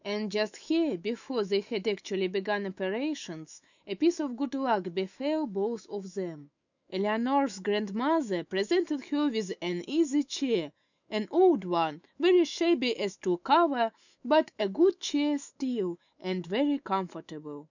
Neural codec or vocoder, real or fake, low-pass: autoencoder, 48 kHz, 128 numbers a frame, DAC-VAE, trained on Japanese speech; fake; 7.2 kHz